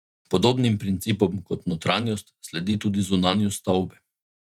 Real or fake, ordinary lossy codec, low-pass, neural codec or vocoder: fake; none; 19.8 kHz; vocoder, 48 kHz, 128 mel bands, Vocos